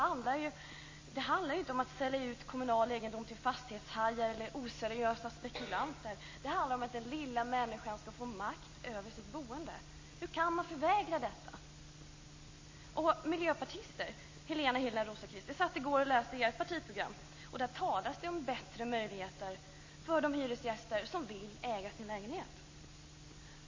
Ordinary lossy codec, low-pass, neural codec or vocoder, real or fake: MP3, 32 kbps; 7.2 kHz; none; real